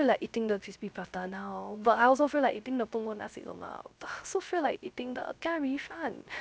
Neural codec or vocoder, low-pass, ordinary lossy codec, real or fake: codec, 16 kHz, 0.3 kbps, FocalCodec; none; none; fake